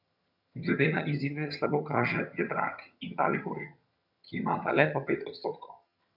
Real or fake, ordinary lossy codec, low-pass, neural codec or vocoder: fake; none; 5.4 kHz; vocoder, 22.05 kHz, 80 mel bands, HiFi-GAN